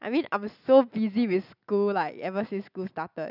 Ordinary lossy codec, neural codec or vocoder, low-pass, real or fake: none; none; 5.4 kHz; real